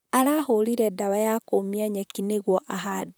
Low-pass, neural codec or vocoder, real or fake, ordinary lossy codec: none; vocoder, 44.1 kHz, 128 mel bands, Pupu-Vocoder; fake; none